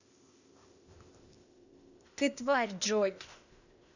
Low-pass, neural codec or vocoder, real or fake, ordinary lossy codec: 7.2 kHz; codec, 16 kHz, 0.8 kbps, ZipCodec; fake; none